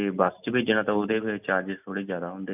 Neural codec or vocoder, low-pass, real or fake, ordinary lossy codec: none; 3.6 kHz; real; none